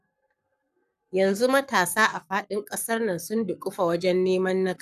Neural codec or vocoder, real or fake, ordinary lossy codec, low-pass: codec, 44.1 kHz, 7.8 kbps, Pupu-Codec; fake; none; 14.4 kHz